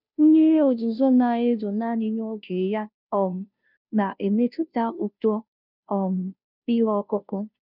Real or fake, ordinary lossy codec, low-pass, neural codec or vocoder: fake; none; 5.4 kHz; codec, 16 kHz, 0.5 kbps, FunCodec, trained on Chinese and English, 25 frames a second